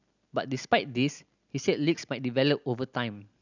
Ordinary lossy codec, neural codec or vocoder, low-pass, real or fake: none; none; 7.2 kHz; real